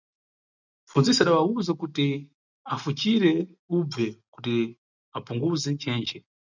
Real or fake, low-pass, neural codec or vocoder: real; 7.2 kHz; none